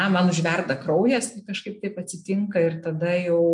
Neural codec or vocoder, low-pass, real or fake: none; 10.8 kHz; real